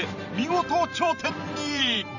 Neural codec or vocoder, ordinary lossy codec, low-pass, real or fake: vocoder, 44.1 kHz, 128 mel bands every 512 samples, BigVGAN v2; none; 7.2 kHz; fake